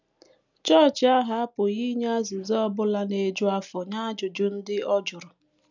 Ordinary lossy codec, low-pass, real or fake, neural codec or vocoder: none; 7.2 kHz; real; none